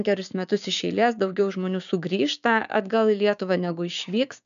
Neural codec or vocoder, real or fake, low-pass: codec, 16 kHz, 6 kbps, DAC; fake; 7.2 kHz